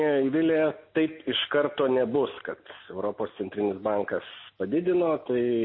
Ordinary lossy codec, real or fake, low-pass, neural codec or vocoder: MP3, 24 kbps; real; 7.2 kHz; none